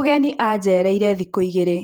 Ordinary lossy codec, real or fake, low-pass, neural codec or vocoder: Opus, 24 kbps; fake; 19.8 kHz; vocoder, 48 kHz, 128 mel bands, Vocos